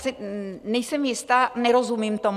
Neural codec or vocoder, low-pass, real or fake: none; 14.4 kHz; real